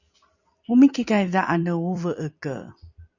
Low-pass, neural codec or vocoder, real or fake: 7.2 kHz; vocoder, 44.1 kHz, 128 mel bands every 256 samples, BigVGAN v2; fake